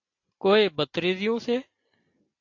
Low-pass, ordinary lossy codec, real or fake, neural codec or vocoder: 7.2 kHz; AAC, 48 kbps; real; none